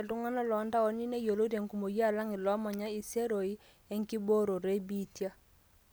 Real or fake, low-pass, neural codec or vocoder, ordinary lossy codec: real; none; none; none